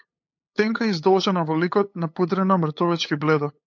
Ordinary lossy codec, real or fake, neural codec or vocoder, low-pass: MP3, 48 kbps; fake; codec, 16 kHz, 8 kbps, FunCodec, trained on LibriTTS, 25 frames a second; 7.2 kHz